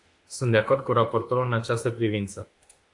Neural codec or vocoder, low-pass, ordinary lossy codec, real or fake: autoencoder, 48 kHz, 32 numbers a frame, DAC-VAE, trained on Japanese speech; 10.8 kHz; AAC, 48 kbps; fake